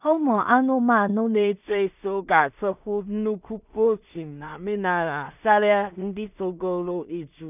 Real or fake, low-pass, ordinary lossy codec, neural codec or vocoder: fake; 3.6 kHz; none; codec, 16 kHz in and 24 kHz out, 0.4 kbps, LongCat-Audio-Codec, two codebook decoder